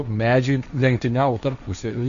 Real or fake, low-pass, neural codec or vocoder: fake; 7.2 kHz; codec, 16 kHz, 1.1 kbps, Voila-Tokenizer